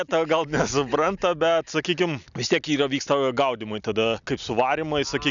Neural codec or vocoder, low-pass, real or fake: none; 7.2 kHz; real